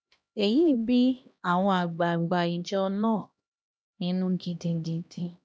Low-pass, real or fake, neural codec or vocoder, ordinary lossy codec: none; fake; codec, 16 kHz, 1 kbps, X-Codec, HuBERT features, trained on LibriSpeech; none